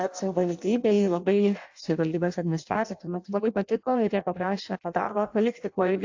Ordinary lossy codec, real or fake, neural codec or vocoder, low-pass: AAC, 48 kbps; fake; codec, 16 kHz in and 24 kHz out, 0.6 kbps, FireRedTTS-2 codec; 7.2 kHz